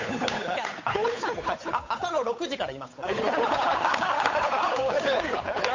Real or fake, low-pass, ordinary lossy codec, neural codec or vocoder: fake; 7.2 kHz; MP3, 48 kbps; codec, 16 kHz, 8 kbps, FunCodec, trained on Chinese and English, 25 frames a second